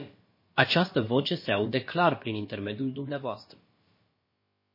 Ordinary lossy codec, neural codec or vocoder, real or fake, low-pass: MP3, 24 kbps; codec, 16 kHz, about 1 kbps, DyCAST, with the encoder's durations; fake; 5.4 kHz